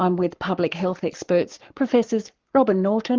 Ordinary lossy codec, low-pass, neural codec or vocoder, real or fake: Opus, 32 kbps; 7.2 kHz; codec, 44.1 kHz, 7.8 kbps, Pupu-Codec; fake